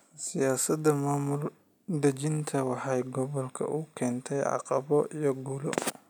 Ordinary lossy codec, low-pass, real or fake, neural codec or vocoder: none; none; real; none